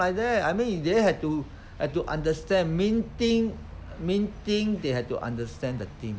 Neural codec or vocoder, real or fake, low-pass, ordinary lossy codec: none; real; none; none